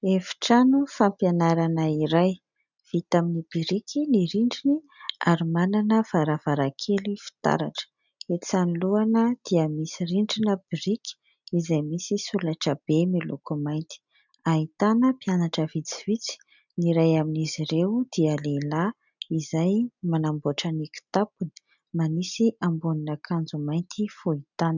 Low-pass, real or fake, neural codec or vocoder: 7.2 kHz; real; none